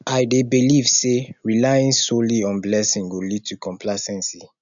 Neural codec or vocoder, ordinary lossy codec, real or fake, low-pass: none; none; real; 7.2 kHz